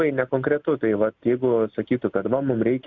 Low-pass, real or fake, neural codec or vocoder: 7.2 kHz; real; none